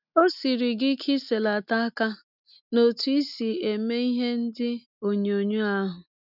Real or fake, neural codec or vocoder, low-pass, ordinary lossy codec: real; none; 5.4 kHz; none